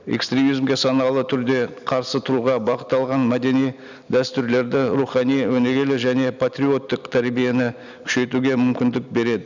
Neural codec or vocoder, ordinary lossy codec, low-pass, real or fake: none; none; 7.2 kHz; real